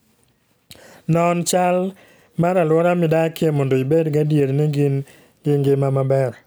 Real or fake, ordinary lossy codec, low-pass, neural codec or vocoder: real; none; none; none